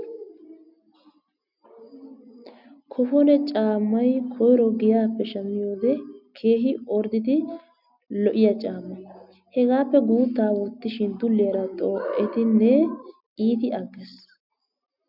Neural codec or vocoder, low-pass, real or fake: none; 5.4 kHz; real